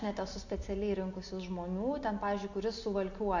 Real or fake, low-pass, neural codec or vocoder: real; 7.2 kHz; none